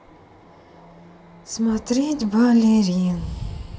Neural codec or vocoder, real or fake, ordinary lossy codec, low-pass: none; real; none; none